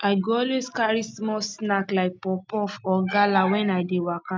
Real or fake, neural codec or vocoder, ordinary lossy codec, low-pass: real; none; none; none